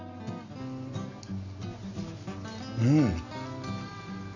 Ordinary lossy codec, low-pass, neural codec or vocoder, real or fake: none; 7.2 kHz; none; real